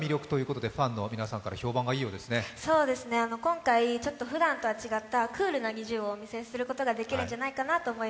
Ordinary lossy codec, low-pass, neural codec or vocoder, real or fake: none; none; none; real